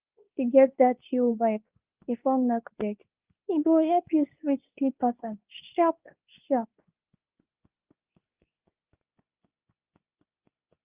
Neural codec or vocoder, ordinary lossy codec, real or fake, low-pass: codec, 24 kHz, 0.9 kbps, WavTokenizer, medium speech release version 2; Opus, 24 kbps; fake; 3.6 kHz